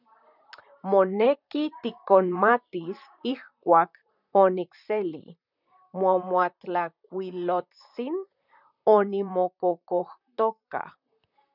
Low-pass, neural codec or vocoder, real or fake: 5.4 kHz; vocoder, 44.1 kHz, 128 mel bands, Pupu-Vocoder; fake